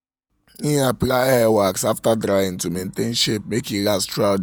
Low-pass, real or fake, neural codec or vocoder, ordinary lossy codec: none; fake; vocoder, 48 kHz, 128 mel bands, Vocos; none